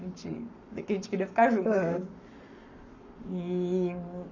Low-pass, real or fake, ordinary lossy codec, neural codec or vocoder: 7.2 kHz; fake; none; codec, 44.1 kHz, 7.8 kbps, Pupu-Codec